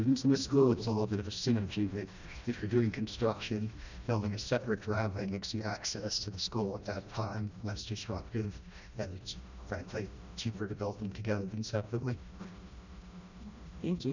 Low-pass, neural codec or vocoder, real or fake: 7.2 kHz; codec, 16 kHz, 1 kbps, FreqCodec, smaller model; fake